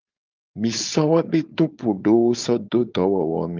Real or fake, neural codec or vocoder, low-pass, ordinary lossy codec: fake; codec, 16 kHz, 4.8 kbps, FACodec; 7.2 kHz; Opus, 32 kbps